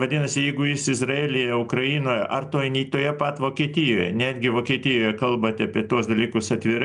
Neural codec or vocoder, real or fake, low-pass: none; real; 9.9 kHz